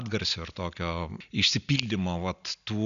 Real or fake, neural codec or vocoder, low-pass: real; none; 7.2 kHz